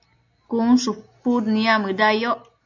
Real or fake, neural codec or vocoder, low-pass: real; none; 7.2 kHz